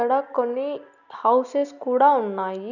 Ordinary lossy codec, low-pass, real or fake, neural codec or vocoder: none; 7.2 kHz; real; none